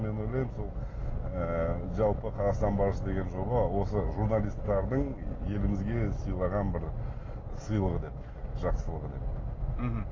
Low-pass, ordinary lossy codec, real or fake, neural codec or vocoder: 7.2 kHz; AAC, 32 kbps; real; none